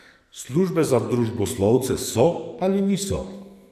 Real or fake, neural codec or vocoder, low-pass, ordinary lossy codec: fake; codec, 44.1 kHz, 2.6 kbps, SNAC; 14.4 kHz; none